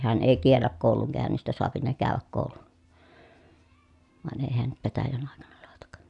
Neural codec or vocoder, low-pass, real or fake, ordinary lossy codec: none; 10.8 kHz; real; none